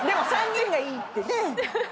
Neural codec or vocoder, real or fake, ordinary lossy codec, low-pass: none; real; none; none